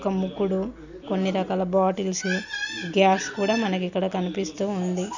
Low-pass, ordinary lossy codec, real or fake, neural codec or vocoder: 7.2 kHz; none; real; none